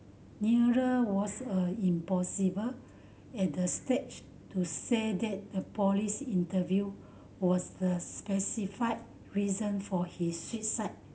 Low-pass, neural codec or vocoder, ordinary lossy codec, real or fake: none; none; none; real